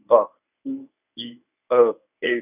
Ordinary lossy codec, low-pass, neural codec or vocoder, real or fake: none; 3.6 kHz; codec, 24 kHz, 0.9 kbps, WavTokenizer, medium speech release version 1; fake